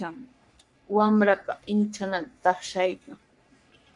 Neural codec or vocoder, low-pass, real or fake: codec, 44.1 kHz, 3.4 kbps, Pupu-Codec; 10.8 kHz; fake